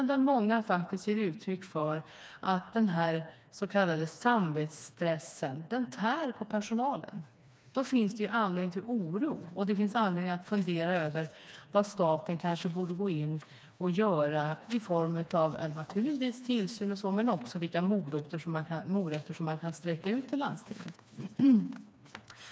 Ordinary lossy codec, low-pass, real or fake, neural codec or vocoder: none; none; fake; codec, 16 kHz, 2 kbps, FreqCodec, smaller model